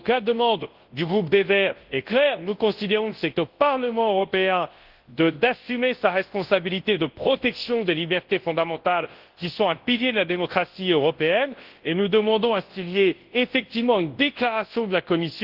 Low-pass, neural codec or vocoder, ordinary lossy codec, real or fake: 5.4 kHz; codec, 24 kHz, 0.9 kbps, WavTokenizer, large speech release; Opus, 16 kbps; fake